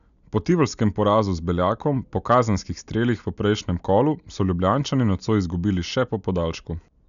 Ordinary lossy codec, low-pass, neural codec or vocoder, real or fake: none; 7.2 kHz; none; real